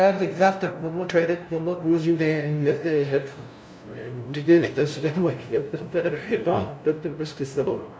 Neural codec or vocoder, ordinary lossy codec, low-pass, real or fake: codec, 16 kHz, 0.5 kbps, FunCodec, trained on LibriTTS, 25 frames a second; none; none; fake